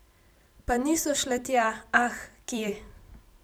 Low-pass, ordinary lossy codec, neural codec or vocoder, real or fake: none; none; vocoder, 44.1 kHz, 128 mel bands every 256 samples, BigVGAN v2; fake